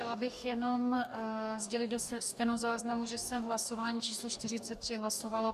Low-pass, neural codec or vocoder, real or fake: 14.4 kHz; codec, 44.1 kHz, 2.6 kbps, DAC; fake